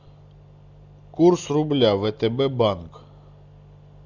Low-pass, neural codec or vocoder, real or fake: 7.2 kHz; none; real